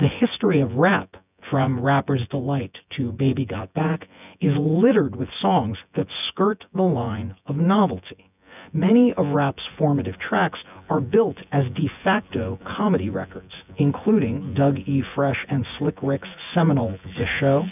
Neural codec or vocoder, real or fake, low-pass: vocoder, 24 kHz, 100 mel bands, Vocos; fake; 3.6 kHz